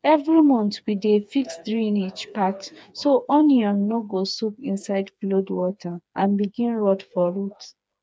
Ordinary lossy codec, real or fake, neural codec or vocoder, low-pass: none; fake; codec, 16 kHz, 4 kbps, FreqCodec, smaller model; none